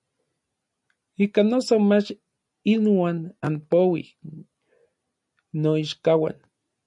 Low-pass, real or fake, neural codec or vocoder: 10.8 kHz; real; none